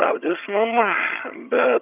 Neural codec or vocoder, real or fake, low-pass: vocoder, 22.05 kHz, 80 mel bands, HiFi-GAN; fake; 3.6 kHz